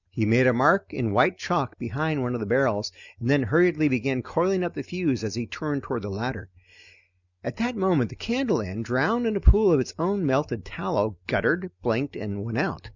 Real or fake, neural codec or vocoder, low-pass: real; none; 7.2 kHz